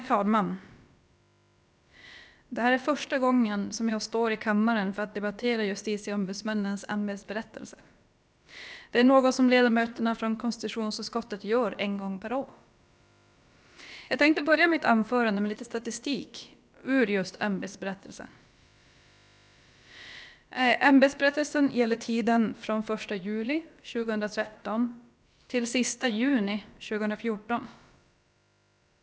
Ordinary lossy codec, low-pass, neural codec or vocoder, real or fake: none; none; codec, 16 kHz, about 1 kbps, DyCAST, with the encoder's durations; fake